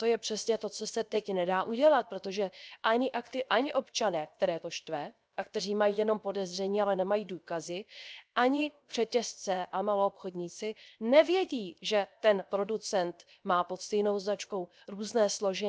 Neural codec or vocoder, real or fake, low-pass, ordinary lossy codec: codec, 16 kHz, 0.7 kbps, FocalCodec; fake; none; none